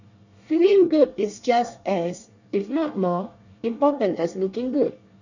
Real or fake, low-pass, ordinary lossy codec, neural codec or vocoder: fake; 7.2 kHz; none; codec, 24 kHz, 1 kbps, SNAC